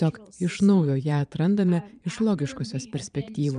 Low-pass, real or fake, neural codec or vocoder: 9.9 kHz; fake; vocoder, 22.05 kHz, 80 mel bands, Vocos